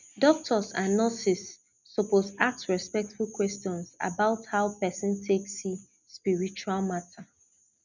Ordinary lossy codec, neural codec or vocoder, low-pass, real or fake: none; none; 7.2 kHz; real